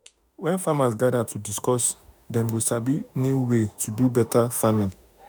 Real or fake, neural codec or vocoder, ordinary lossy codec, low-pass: fake; autoencoder, 48 kHz, 32 numbers a frame, DAC-VAE, trained on Japanese speech; none; none